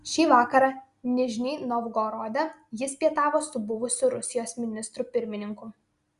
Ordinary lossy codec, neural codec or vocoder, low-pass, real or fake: Opus, 64 kbps; none; 10.8 kHz; real